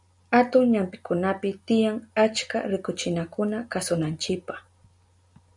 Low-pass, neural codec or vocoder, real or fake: 10.8 kHz; none; real